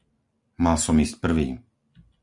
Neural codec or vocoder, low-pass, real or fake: none; 10.8 kHz; real